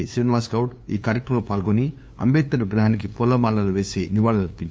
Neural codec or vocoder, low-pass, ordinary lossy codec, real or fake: codec, 16 kHz, 2 kbps, FunCodec, trained on LibriTTS, 25 frames a second; none; none; fake